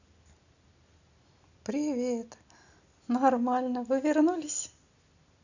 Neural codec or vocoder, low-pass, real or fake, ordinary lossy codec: none; 7.2 kHz; real; none